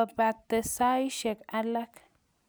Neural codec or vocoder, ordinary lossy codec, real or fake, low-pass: none; none; real; none